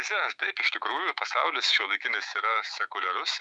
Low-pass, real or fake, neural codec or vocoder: 10.8 kHz; fake; autoencoder, 48 kHz, 128 numbers a frame, DAC-VAE, trained on Japanese speech